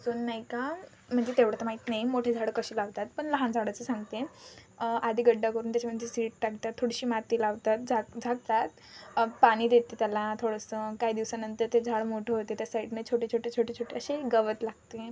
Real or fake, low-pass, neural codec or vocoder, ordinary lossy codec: real; none; none; none